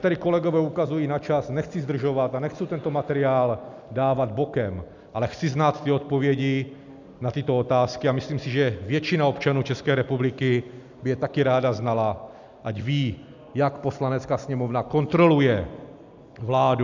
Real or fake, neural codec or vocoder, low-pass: real; none; 7.2 kHz